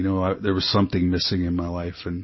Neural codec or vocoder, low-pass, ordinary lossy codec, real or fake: none; 7.2 kHz; MP3, 24 kbps; real